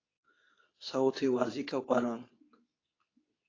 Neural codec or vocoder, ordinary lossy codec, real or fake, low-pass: codec, 24 kHz, 0.9 kbps, WavTokenizer, medium speech release version 2; AAC, 32 kbps; fake; 7.2 kHz